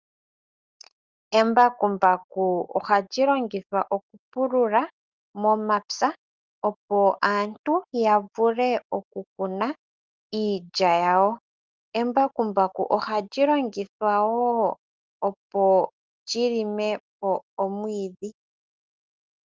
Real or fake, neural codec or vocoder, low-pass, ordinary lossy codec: real; none; 7.2 kHz; Opus, 32 kbps